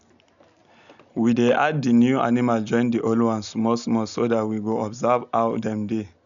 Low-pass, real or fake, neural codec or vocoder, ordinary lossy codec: 7.2 kHz; real; none; none